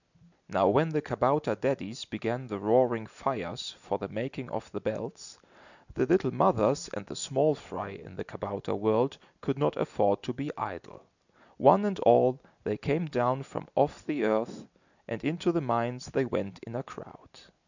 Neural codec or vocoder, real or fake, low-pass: none; real; 7.2 kHz